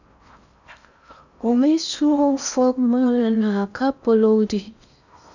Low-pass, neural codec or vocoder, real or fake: 7.2 kHz; codec, 16 kHz in and 24 kHz out, 0.6 kbps, FocalCodec, streaming, 4096 codes; fake